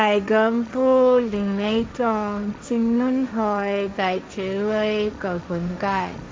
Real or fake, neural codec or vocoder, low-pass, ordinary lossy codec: fake; codec, 16 kHz, 1.1 kbps, Voila-Tokenizer; none; none